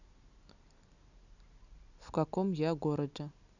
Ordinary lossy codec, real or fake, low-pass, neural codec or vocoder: none; real; 7.2 kHz; none